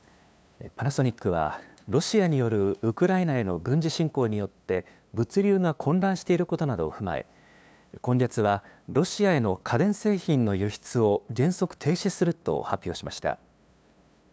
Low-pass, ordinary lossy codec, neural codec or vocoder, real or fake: none; none; codec, 16 kHz, 2 kbps, FunCodec, trained on LibriTTS, 25 frames a second; fake